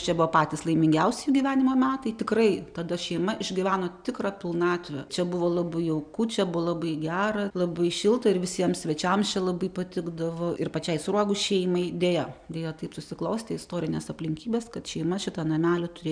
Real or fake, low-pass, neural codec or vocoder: real; 9.9 kHz; none